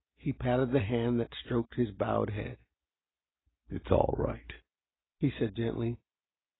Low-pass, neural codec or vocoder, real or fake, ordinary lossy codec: 7.2 kHz; none; real; AAC, 16 kbps